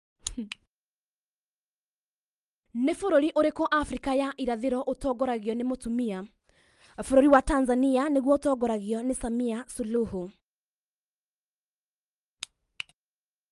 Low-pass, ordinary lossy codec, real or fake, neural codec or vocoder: 10.8 kHz; Opus, 32 kbps; real; none